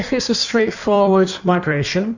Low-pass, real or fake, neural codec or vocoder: 7.2 kHz; fake; codec, 16 kHz in and 24 kHz out, 1.1 kbps, FireRedTTS-2 codec